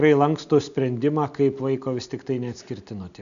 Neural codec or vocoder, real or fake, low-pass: none; real; 7.2 kHz